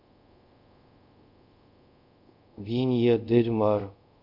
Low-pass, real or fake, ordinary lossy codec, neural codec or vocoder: 5.4 kHz; fake; MP3, 48 kbps; codec, 24 kHz, 0.5 kbps, DualCodec